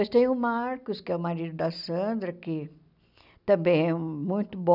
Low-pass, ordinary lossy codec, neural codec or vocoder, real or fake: 5.4 kHz; none; none; real